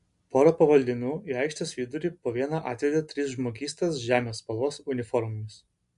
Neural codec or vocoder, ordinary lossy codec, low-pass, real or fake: none; MP3, 64 kbps; 10.8 kHz; real